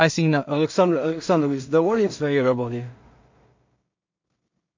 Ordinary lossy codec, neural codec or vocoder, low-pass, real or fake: MP3, 48 kbps; codec, 16 kHz in and 24 kHz out, 0.4 kbps, LongCat-Audio-Codec, two codebook decoder; 7.2 kHz; fake